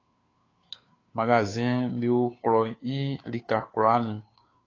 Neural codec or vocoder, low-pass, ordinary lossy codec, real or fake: codec, 16 kHz, 2 kbps, FunCodec, trained on Chinese and English, 25 frames a second; 7.2 kHz; AAC, 32 kbps; fake